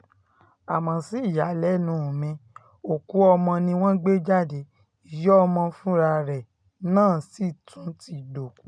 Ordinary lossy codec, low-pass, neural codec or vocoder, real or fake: none; 9.9 kHz; none; real